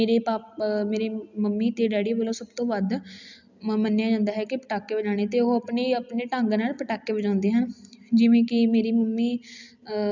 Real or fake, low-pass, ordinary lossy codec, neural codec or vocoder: real; 7.2 kHz; none; none